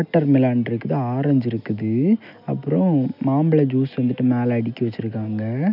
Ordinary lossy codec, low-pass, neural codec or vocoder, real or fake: none; 5.4 kHz; none; real